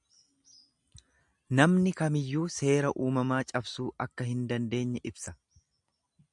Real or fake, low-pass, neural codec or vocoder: real; 10.8 kHz; none